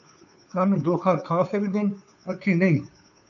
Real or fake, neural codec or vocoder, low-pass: fake; codec, 16 kHz, 2 kbps, FunCodec, trained on Chinese and English, 25 frames a second; 7.2 kHz